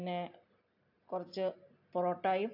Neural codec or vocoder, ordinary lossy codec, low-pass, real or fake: none; AAC, 32 kbps; 5.4 kHz; real